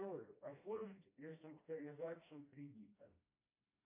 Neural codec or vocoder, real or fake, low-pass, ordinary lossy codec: codec, 16 kHz, 1 kbps, FreqCodec, smaller model; fake; 3.6 kHz; MP3, 24 kbps